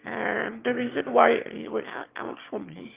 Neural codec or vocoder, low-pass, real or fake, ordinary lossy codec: autoencoder, 22.05 kHz, a latent of 192 numbers a frame, VITS, trained on one speaker; 3.6 kHz; fake; Opus, 24 kbps